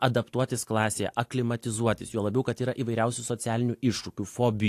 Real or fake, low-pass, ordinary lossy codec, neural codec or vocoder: real; 14.4 kHz; AAC, 64 kbps; none